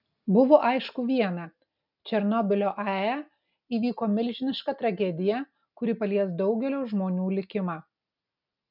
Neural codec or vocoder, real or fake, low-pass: none; real; 5.4 kHz